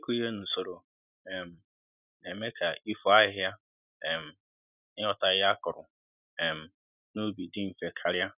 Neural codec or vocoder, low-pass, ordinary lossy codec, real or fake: none; 3.6 kHz; none; real